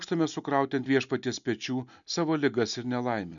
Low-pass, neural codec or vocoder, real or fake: 7.2 kHz; none; real